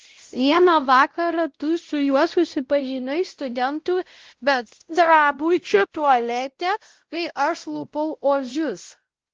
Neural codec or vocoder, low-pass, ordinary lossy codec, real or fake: codec, 16 kHz, 0.5 kbps, X-Codec, WavLM features, trained on Multilingual LibriSpeech; 7.2 kHz; Opus, 16 kbps; fake